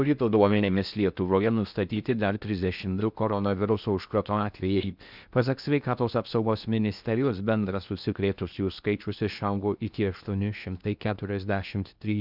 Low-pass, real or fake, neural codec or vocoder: 5.4 kHz; fake; codec, 16 kHz in and 24 kHz out, 0.6 kbps, FocalCodec, streaming, 4096 codes